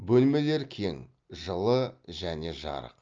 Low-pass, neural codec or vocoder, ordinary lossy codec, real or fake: 7.2 kHz; none; Opus, 24 kbps; real